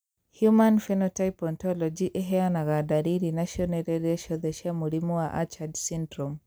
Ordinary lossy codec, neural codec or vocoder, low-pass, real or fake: none; none; none; real